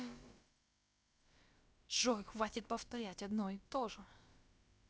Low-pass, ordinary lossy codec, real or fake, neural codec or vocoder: none; none; fake; codec, 16 kHz, about 1 kbps, DyCAST, with the encoder's durations